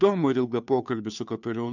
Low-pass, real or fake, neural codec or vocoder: 7.2 kHz; fake; codec, 16 kHz, 2 kbps, FunCodec, trained on Chinese and English, 25 frames a second